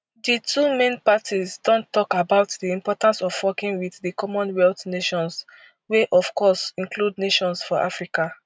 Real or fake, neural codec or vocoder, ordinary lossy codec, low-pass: real; none; none; none